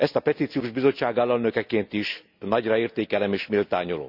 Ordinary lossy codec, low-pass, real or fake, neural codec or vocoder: none; 5.4 kHz; real; none